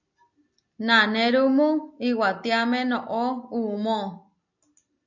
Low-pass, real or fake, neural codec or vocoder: 7.2 kHz; real; none